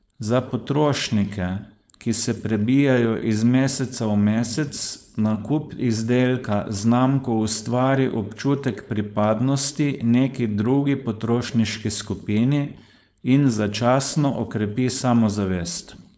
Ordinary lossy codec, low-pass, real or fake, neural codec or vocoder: none; none; fake; codec, 16 kHz, 4.8 kbps, FACodec